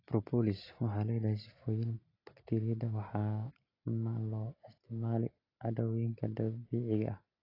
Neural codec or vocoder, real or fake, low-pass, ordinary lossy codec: none; real; 5.4 kHz; AAC, 24 kbps